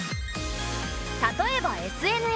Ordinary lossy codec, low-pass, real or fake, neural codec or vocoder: none; none; real; none